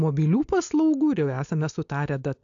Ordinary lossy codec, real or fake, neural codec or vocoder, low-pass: AAC, 64 kbps; real; none; 7.2 kHz